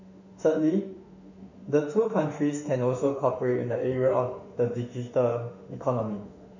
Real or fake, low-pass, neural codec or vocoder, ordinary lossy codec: fake; 7.2 kHz; autoencoder, 48 kHz, 32 numbers a frame, DAC-VAE, trained on Japanese speech; none